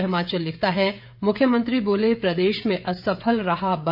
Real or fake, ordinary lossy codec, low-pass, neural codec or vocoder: fake; AAC, 48 kbps; 5.4 kHz; codec, 16 kHz, 16 kbps, FreqCodec, smaller model